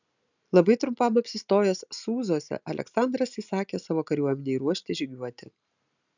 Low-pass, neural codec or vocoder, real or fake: 7.2 kHz; none; real